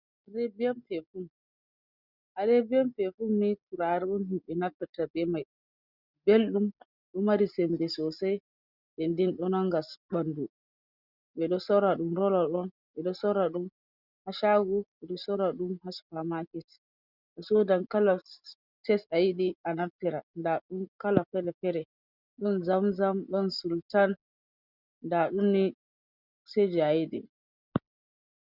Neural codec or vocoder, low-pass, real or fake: none; 5.4 kHz; real